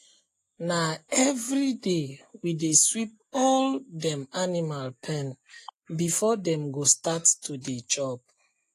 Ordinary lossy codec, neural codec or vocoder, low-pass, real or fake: AAC, 48 kbps; none; 14.4 kHz; real